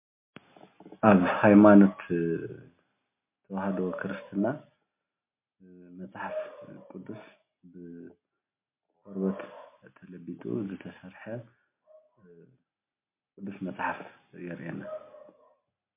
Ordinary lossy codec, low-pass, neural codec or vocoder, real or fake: MP3, 24 kbps; 3.6 kHz; none; real